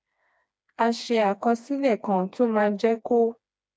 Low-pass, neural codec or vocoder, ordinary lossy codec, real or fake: none; codec, 16 kHz, 2 kbps, FreqCodec, smaller model; none; fake